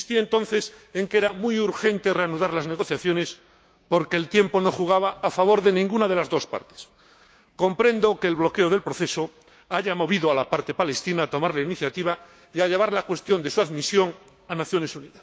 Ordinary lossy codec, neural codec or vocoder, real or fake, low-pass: none; codec, 16 kHz, 6 kbps, DAC; fake; none